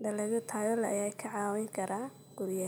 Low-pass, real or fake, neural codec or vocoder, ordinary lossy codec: none; real; none; none